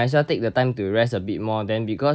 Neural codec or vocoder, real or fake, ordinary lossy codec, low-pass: none; real; none; none